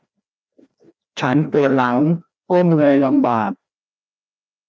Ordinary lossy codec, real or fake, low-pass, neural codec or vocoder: none; fake; none; codec, 16 kHz, 1 kbps, FreqCodec, larger model